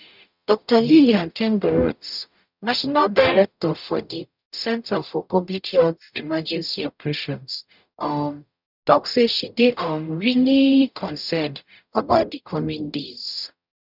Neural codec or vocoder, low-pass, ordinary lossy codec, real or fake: codec, 44.1 kHz, 0.9 kbps, DAC; 5.4 kHz; none; fake